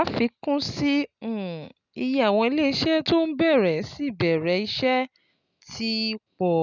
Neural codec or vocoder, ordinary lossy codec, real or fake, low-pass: none; none; real; 7.2 kHz